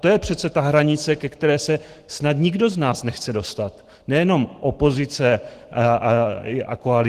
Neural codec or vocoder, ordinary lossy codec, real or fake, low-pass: autoencoder, 48 kHz, 128 numbers a frame, DAC-VAE, trained on Japanese speech; Opus, 16 kbps; fake; 14.4 kHz